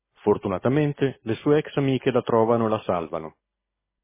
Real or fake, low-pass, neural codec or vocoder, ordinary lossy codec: real; 3.6 kHz; none; MP3, 16 kbps